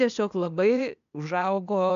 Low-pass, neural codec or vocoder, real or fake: 7.2 kHz; codec, 16 kHz, 0.8 kbps, ZipCodec; fake